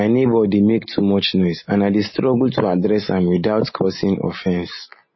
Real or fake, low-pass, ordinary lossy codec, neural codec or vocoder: real; 7.2 kHz; MP3, 24 kbps; none